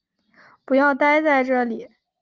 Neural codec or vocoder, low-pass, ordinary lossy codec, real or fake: none; 7.2 kHz; Opus, 24 kbps; real